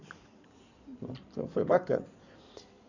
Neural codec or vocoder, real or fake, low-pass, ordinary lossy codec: codec, 16 kHz in and 24 kHz out, 2.2 kbps, FireRedTTS-2 codec; fake; 7.2 kHz; none